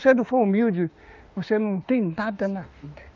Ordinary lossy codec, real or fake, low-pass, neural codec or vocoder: Opus, 32 kbps; fake; 7.2 kHz; autoencoder, 48 kHz, 32 numbers a frame, DAC-VAE, trained on Japanese speech